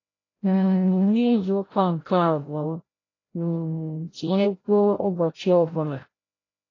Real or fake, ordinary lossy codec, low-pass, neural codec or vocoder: fake; AAC, 32 kbps; 7.2 kHz; codec, 16 kHz, 0.5 kbps, FreqCodec, larger model